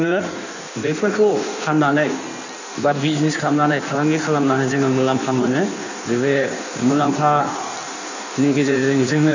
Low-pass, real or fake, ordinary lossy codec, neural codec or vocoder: 7.2 kHz; fake; none; codec, 16 kHz in and 24 kHz out, 1.1 kbps, FireRedTTS-2 codec